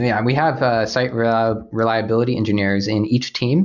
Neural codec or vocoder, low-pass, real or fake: none; 7.2 kHz; real